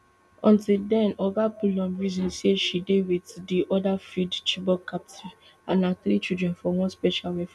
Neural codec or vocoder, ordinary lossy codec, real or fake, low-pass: none; none; real; none